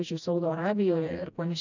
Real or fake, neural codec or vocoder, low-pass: fake; codec, 16 kHz, 1 kbps, FreqCodec, smaller model; 7.2 kHz